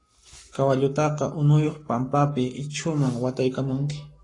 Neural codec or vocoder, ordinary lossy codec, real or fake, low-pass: codec, 44.1 kHz, 7.8 kbps, Pupu-Codec; AAC, 32 kbps; fake; 10.8 kHz